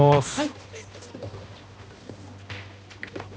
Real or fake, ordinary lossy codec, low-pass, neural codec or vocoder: fake; none; none; codec, 16 kHz, 1 kbps, X-Codec, HuBERT features, trained on balanced general audio